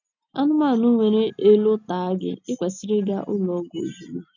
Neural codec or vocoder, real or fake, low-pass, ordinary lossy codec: none; real; 7.2 kHz; none